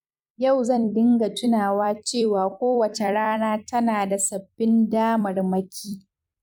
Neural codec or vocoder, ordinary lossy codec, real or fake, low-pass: vocoder, 44.1 kHz, 128 mel bands every 256 samples, BigVGAN v2; none; fake; 19.8 kHz